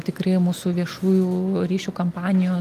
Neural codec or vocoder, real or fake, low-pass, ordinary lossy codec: none; real; 14.4 kHz; Opus, 32 kbps